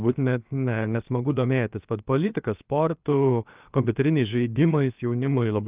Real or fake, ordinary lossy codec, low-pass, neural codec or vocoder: fake; Opus, 24 kbps; 3.6 kHz; codec, 16 kHz, 0.7 kbps, FocalCodec